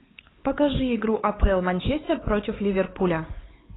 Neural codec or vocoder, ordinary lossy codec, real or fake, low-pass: codec, 16 kHz, 4 kbps, X-Codec, HuBERT features, trained on LibriSpeech; AAC, 16 kbps; fake; 7.2 kHz